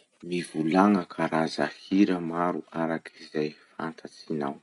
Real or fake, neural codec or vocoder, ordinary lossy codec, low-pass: real; none; none; 10.8 kHz